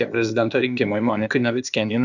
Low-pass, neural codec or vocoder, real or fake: 7.2 kHz; codec, 16 kHz, 0.8 kbps, ZipCodec; fake